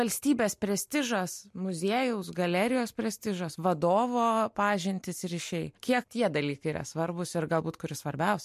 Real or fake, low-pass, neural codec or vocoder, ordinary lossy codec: real; 14.4 kHz; none; MP3, 64 kbps